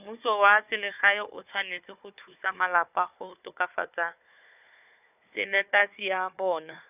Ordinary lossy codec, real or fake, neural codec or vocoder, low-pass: none; fake; codec, 16 kHz, 4 kbps, FunCodec, trained on Chinese and English, 50 frames a second; 3.6 kHz